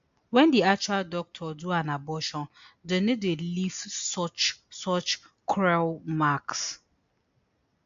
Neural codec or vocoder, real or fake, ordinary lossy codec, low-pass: none; real; AAC, 64 kbps; 7.2 kHz